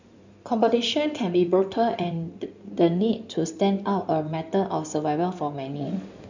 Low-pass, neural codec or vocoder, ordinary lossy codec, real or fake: 7.2 kHz; codec, 16 kHz in and 24 kHz out, 2.2 kbps, FireRedTTS-2 codec; none; fake